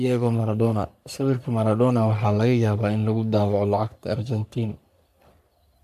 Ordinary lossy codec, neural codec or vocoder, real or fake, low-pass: MP3, 96 kbps; codec, 44.1 kHz, 3.4 kbps, Pupu-Codec; fake; 14.4 kHz